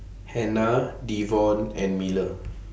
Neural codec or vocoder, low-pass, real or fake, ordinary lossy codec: none; none; real; none